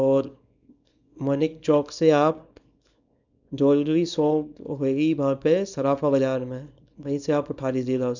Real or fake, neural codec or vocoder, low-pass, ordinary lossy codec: fake; codec, 24 kHz, 0.9 kbps, WavTokenizer, small release; 7.2 kHz; none